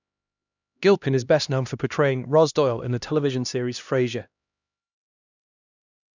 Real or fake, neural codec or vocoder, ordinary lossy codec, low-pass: fake; codec, 16 kHz, 1 kbps, X-Codec, HuBERT features, trained on LibriSpeech; none; 7.2 kHz